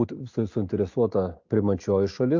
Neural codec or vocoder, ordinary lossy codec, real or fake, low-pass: none; AAC, 48 kbps; real; 7.2 kHz